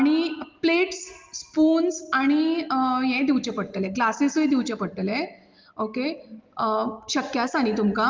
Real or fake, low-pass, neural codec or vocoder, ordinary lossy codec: real; 7.2 kHz; none; Opus, 32 kbps